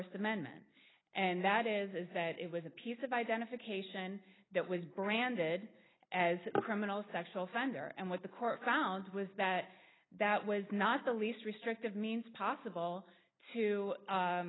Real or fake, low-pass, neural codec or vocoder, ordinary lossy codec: real; 7.2 kHz; none; AAC, 16 kbps